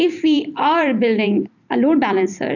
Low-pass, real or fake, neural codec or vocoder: 7.2 kHz; fake; vocoder, 44.1 kHz, 128 mel bands every 256 samples, BigVGAN v2